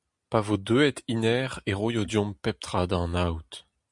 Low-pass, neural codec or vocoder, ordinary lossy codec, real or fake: 10.8 kHz; none; MP3, 96 kbps; real